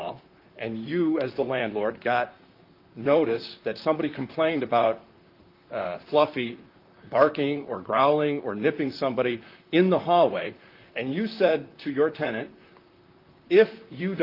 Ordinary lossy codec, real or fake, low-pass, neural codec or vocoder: Opus, 32 kbps; fake; 5.4 kHz; vocoder, 44.1 kHz, 128 mel bands, Pupu-Vocoder